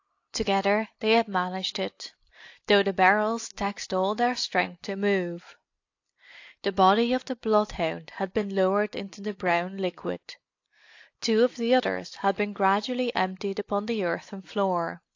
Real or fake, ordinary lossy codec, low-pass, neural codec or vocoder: real; AAC, 48 kbps; 7.2 kHz; none